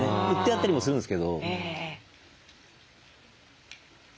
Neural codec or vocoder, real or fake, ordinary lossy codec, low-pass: none; real; none; none